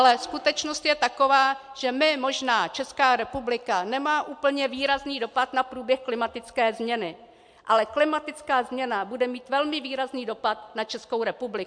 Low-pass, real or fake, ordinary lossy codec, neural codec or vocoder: 9.9 kHz; real; MP3, 64 kbps; none